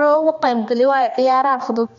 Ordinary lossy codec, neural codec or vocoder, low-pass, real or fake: MP3, 48 kbps; codec, 16 kHz, 2 kbps, X-Codec, HuBERT features, trained on balanced general audio; 7.2 kHz; fake